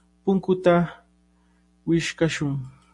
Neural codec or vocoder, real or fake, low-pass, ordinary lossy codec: none; real; 10.8 kHz; MP3, 48 kbps